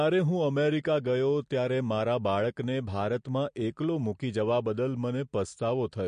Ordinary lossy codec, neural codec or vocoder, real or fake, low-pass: MP3, 48 kbps; none; real; 9.9 kHz